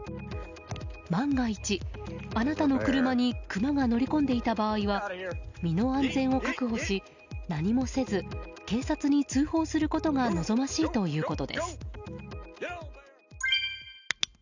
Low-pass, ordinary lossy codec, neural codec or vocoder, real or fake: 7.2 kHz; none; none; real